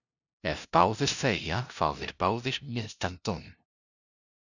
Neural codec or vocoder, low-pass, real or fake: codec, 16 kHz, 0.5 kbps, FunCodec, trained on LibriTTS, 25 frames a second; 7.2 kHz; fake